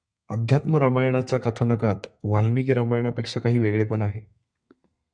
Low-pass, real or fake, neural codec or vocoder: 9.9 kHz; fake; codec, 32 kHz, 1.9 kbps, SNAC